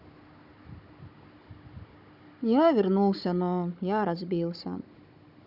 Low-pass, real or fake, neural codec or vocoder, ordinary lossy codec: 5.4 kHz; fake; codec, 16 kHz, 16 kbps, FunCodec, trained on Chinese and English, 50 frames a second; none